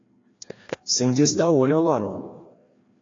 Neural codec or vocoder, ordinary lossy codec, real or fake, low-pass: codec, 16 kHz, 1 kbps, FreqCodec, larger model; AAC, 32 kbps; fake; 7.2 kHz